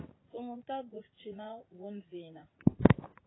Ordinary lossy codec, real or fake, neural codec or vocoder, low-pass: AAC, 16 kbps; fake; vocoder, 44.1 kHz, 80 mel bands, Vocos; 7.2 kHz